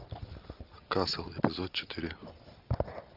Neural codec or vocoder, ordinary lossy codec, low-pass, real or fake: none; Opus, 24 kbps; 5.4 kHz; real